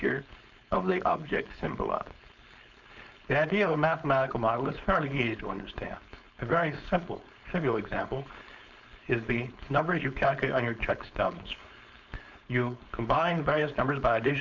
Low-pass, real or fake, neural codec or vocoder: 7.2 kHz; fake; codec, 16 kHz, 4.8 kbps, FACodec